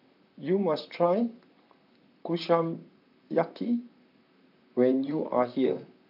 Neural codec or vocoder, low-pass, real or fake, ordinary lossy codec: vocoder, 44.1 kHz, 128 mel bands, Pupu-Vocoder; 5.4 kHz; fake; AAC, 48 kbps